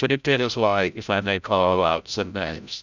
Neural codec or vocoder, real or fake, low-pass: codec, 16 kHz, 0.5 kbps, FreqCodec, larger model; fake; 7.2 kHz